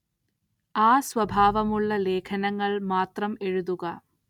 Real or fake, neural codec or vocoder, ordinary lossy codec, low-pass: real; none; none; 19.8 kHz